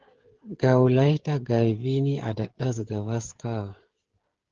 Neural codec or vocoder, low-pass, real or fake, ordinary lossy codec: codec, 16 kHz, 16 kbps, FreqCodec, smaller model; 7.2 kHz; fake; Opus, 16 kbps